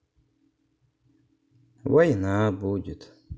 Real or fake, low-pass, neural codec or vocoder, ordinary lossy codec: real; none; none; none